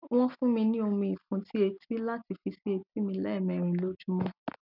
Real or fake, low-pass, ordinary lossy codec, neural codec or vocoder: real; 5.4 kHz; none; none